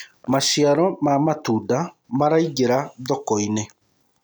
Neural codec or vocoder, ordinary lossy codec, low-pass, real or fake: none; none; none; real